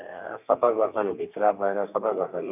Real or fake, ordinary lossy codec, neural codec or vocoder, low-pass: fake; none; codec, 32 kHz, 1.9 kbps, SNAC; 3.6 kHz